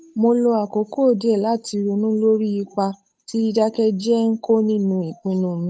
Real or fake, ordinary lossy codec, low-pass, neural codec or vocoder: fake; none; none; codec, 16 kHz, 8 kbps, FunCodec, trained on Chinese and English, 25 frames a second